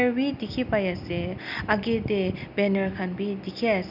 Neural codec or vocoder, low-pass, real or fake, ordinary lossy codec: none; 5.4 kHz; real; none